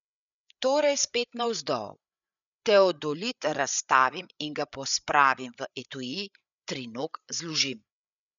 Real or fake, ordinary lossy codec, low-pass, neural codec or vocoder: fake; none; 7.2 kHz; codec, 16 kHz, 8 kbps, FreqCodec, larger model